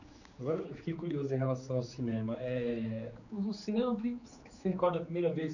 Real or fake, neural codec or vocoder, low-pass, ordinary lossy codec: fake; codec, 16 kHz, 4 kbps, X-Codec, HuBERT features, trained on general audio; 7.2 kHz; none